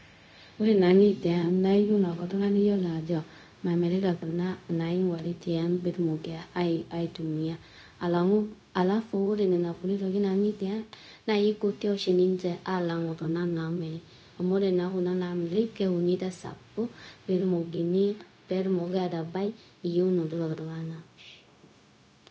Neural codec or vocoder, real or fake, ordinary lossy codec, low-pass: codec, 16 kHz, 0.4 kbps, LongCat-Audio-Codec; fake; none; none